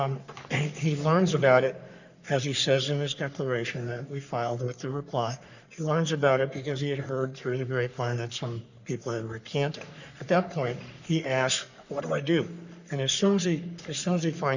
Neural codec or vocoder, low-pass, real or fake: codec, 44.1 kHz, 3.4 kbps, Pupu-Codec; 7.2 kHz; fake